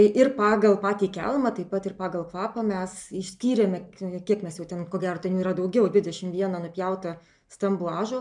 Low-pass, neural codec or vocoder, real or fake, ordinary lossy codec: 10.8 kHz; none; real; MP3, 96 kbps